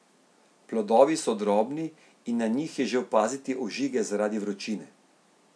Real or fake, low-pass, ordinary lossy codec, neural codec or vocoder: real; none; none; none